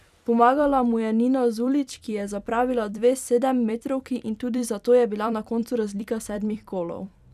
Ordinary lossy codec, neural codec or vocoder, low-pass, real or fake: none; vocoder, 44.1 kHz, 128 mel bands every 256 samples, BigVGAN v2; 14.4 kHz; fake